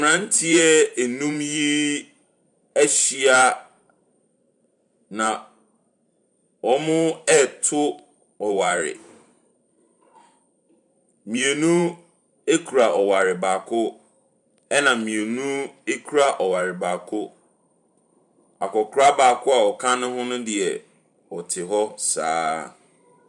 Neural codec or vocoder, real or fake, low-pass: vocoder, 44.1 kHz, 128 mel bands every 512 samples, BigVGAN v2; fake; 10.8 kHz